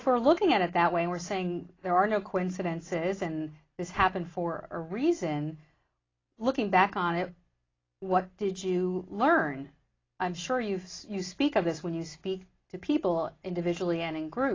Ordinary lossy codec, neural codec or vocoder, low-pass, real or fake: AAC, 32 kbps; none; 7.2 kHz; real